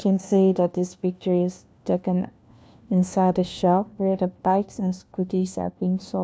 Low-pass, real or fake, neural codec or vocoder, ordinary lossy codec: none; fake; codec, 16 kHz, 1 kbps, FunCodec, trained on LibriTTS, 50 frames a second; none